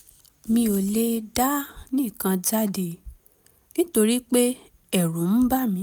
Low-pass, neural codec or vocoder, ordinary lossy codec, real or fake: none; none; none; real